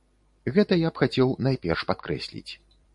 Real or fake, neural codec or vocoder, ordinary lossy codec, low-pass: fake; vocoder, 44.1 kHz, 128 mel bands every 512 samples, BigVGAN v2; MP3, 64 kbps; 10.8 kHz